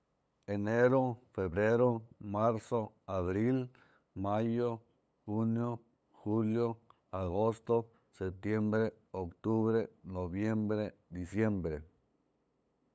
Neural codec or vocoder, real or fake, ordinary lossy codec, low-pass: codec, 16 kHz, 8 kbps, FunCodec, trained on LibriTTS, 25 frames a second; fake; none; none